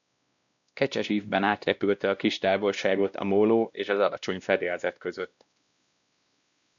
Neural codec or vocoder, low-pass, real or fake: codec, 16 kHz, 1 kbps, X-Codec, WavLM features, trained on Multilingual LibriSpeech; 7.2 kHz; fake